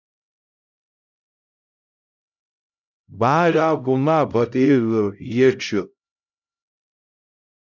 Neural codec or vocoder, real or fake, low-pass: codec, 16 kHz, 0.5 kbps, X-Codec, HuBERT features, trained on LibriSpeech; fake; 7.2 kHz